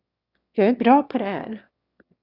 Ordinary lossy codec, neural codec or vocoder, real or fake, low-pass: Opus, 64 kbps; autoencoder, 22.05 kHz, a latent of 192 numbers a frame, VITS, trained on one speaker; fake; 5.4 kHz